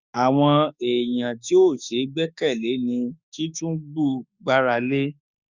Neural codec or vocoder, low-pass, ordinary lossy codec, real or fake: autoencoder, 48 kHz, 32 numbers a frame, DAC-VAE, trained on Japanese speech; 7.2 kHz; Opus, 64 kbps; fake